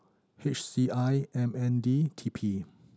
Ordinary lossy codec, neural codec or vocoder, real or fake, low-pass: none; none; real; none